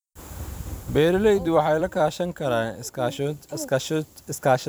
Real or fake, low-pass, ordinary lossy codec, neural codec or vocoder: fake; none; none; vocoder, 44.1 kHz, 128 mel bands every 256 samples, BigVGAN v2